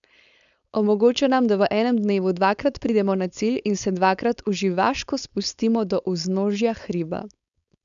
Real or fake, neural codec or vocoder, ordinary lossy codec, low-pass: fake; codec, 16 kHz, 4.8 kbps, FACodec; none; 7.2 kHz